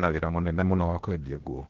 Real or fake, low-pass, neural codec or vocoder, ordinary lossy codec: fake; 7.2 kHz; codec, 16 kHz, 0.8 kbps, ZipCodec; Opus, 16 kbps